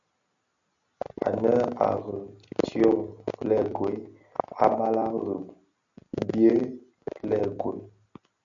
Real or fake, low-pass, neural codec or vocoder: real; 7.2 kHz; none